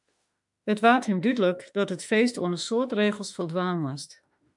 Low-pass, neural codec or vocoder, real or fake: 10.8 kHz; autoencoder, 48 kHz, 32 numbers a frame, DAC-VAE, trained on Japanese speech; fake